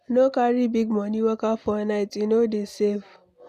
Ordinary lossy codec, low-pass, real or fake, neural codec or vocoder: none; 14.4 kHz; real; none